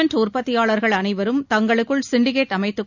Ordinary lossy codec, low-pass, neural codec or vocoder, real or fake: none; 7.2 kHz; none; real